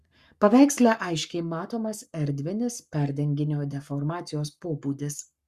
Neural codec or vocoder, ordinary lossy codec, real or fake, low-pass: codec, 44.1 kHz, 7.8 kbps, Pupu-Codec; Opus, 64 kbps; fake; 14.4 kHz